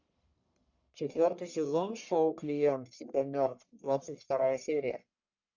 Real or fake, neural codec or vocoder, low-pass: fake; codec, 44.1 kHz, 1.7 kbps, Pupu-Codec; 7.2 kHz